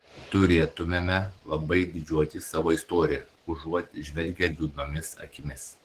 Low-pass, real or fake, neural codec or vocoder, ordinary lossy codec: 14.4 kHz; fake; codec, 44.1 kHz, 7.8 kbps, DAC; Opus, 16 kbps